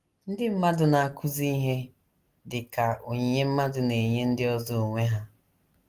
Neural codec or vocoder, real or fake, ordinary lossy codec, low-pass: none; real; Opus, 24 kbps; 14.4 kHz